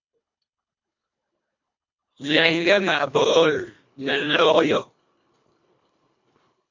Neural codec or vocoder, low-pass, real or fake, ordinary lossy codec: codec, 24 kHz, 1.5 kbps, HILCodec; 7.2 kHz; fake; MP3, 48 kbps